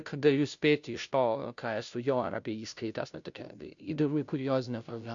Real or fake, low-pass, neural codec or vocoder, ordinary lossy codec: fake; 7.2 kHz; codec, 16 kHz, 0.5 kbps, FunCodec, trained on Chinese and English, 25 frames a second; MP3, 64 kbps